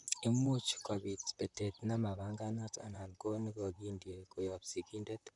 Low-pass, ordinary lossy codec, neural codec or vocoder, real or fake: 10.8 kHz; none; vocoder, 44.1 kHz, 128 mel bands, Pupu-Vocoder; fake